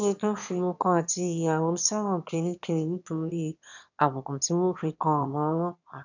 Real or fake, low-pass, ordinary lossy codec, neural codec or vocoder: fake; 7.2 kHz; none; autoencoder, 22.05 kHz, a latent of 192 numbers a frame, VITS, trained on one speaker